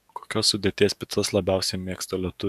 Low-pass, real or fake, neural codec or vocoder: 14.4 kHz; fake; codec, 44.1 kHz, 7.8 kbps, DAC